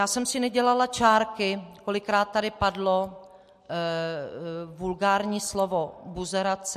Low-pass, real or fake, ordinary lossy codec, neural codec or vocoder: 14.4 kHz; real; MP3, 64 kbps; none